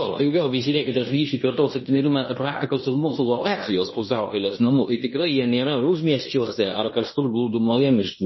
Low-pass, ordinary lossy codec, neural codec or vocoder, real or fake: 7.2 kHz; MP3, 24 kbps; codec, 16 kHz in and 24 kHz out, 0.9 kbps, LongCat-Audio-Codec, fine tuned four codebook decoder; fake